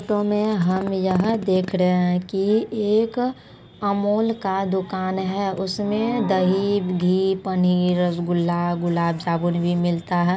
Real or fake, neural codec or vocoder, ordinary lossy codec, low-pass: real; none; none; none